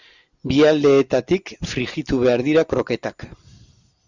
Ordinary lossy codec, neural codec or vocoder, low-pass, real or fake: Opus, 64 kbps; none; 7.2 kHz; real